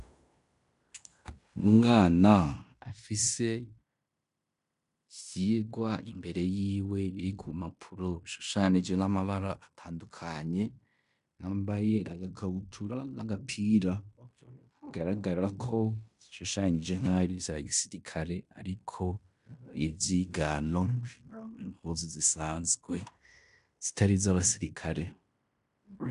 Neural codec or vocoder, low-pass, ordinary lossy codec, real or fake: codec, 16 kHz in and 24 kHz out, 0.9 kbps, LongCat-Audio-Codec, fine tuned four codebook decoder; 10.8 kHz; MP3, 96 kbps; fake